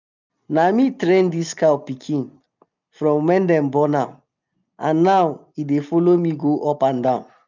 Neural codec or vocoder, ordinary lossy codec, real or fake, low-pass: none; none; real; 7.2 kHz